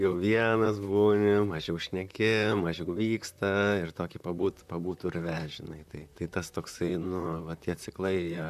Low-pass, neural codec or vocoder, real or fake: 14.4 kHz; vocoder, 44.1 kHz, 128 mel bands, Pupu-Vocoder; fake